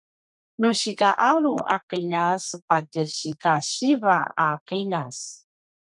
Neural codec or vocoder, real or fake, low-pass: codec, 32 kHz, 1.9 kbps, SNAC; fake; 10.8 kHz